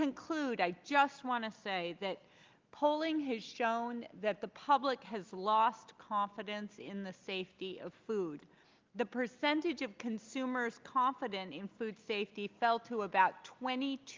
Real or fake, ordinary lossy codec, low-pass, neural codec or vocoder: real; Opus, 24 kbps; 7.2 kHz; none